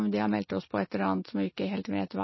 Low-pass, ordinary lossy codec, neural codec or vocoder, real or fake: 7.2 kHz; MP3, 24 kbps; none; real